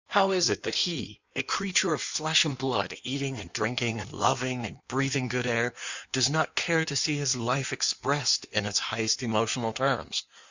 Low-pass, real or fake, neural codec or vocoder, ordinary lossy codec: 7.2 kHz; fake; codec, 16 kHz in and 24 kHz out, 1.1 kbps, FireRedTTS-2 codec; Opus, 64 kbps